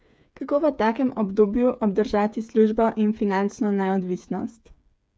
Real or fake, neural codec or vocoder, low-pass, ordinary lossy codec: fake; codec, 16 kHz, 8 kbps, FreqCodec, smaller model; none; none